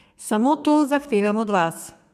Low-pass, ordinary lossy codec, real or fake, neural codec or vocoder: 14.4 kHz; MP3, 96 kbps; fake; codec, 32 kHz, 1.9 kbps, SNAC